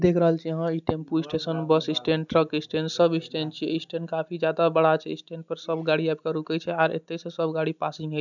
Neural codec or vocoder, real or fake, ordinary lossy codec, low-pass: none; real; none; 7.2 kHz